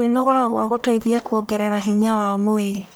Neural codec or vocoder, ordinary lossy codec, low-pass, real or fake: codec, 44.1 kHz, 1.7 kbps, Pupu-Codec; none; none; fake